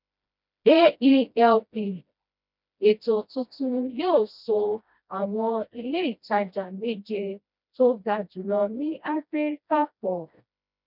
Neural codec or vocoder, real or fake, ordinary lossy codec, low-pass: codec, 16 kHz, 1 kbps, FreqCodec, smaller model; fake; none; 5.4 kHz